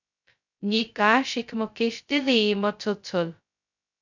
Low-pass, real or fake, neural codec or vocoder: 7.2 kHz; fake; codec, 16 kHz, 0.2 kbps, FocalCodec